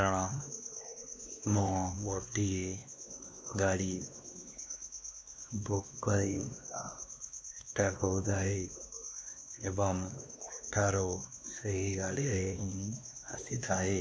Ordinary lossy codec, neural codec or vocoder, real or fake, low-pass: none; codec, 16 kHz, 2 kbps, X-Codec, WavLM features, trained on Multilingual LibriSpeech; fake; none